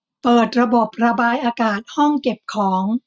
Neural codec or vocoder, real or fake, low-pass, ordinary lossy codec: none; real; none; none